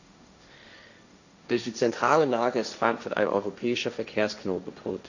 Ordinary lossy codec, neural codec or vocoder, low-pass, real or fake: none; codec, 16 kHz, 1.1 kbps, Voila-Tokenizer; 7.2 kHz; fake